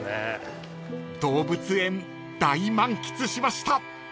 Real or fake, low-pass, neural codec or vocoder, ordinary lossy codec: real; none; none; none